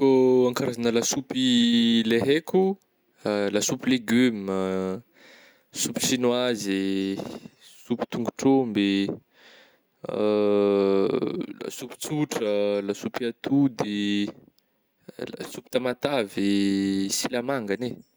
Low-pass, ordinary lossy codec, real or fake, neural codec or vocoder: none; none; real; none